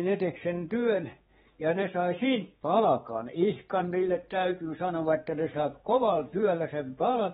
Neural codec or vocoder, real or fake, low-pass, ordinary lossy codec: codec, 16 kHz, 4 kbps, X-Codec, HuBERT features, trained on general audio; fake; 7.2 kHz; AAC, 16 kbps